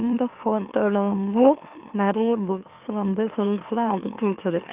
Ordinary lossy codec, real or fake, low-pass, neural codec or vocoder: Opus, 32 kbps; fake; 3.6 kHz; autoencoder, 44.1 kHz, a latent of 192 numbers a frame, MeloTTS